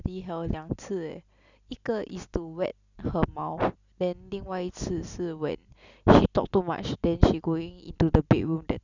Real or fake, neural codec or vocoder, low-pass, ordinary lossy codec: real; none; 7.2 kHz; none